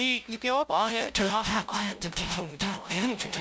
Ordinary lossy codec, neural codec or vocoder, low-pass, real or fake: none; codec, 16 kHz, 0.5 kbps, FunCodec, trained on LibriTTS, 25 frames a second; none; fake